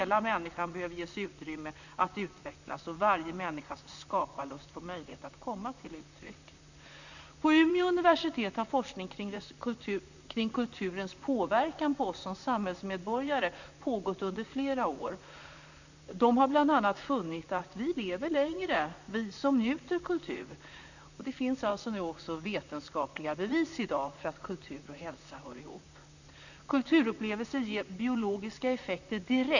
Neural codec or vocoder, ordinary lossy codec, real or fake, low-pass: vocoder, 44.1 kHz, 128 mel bands, Pupu-Vocoder; none; fake; 7.2 kHz